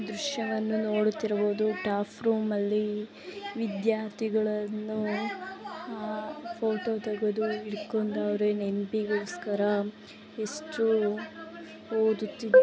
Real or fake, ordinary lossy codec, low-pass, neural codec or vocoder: real; none; none; none